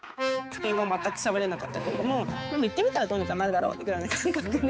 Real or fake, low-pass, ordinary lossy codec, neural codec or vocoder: fake; none; none; codec, 16 kHz, 4 kbps, X-Codec, HuBERT features, trained on balanced general audio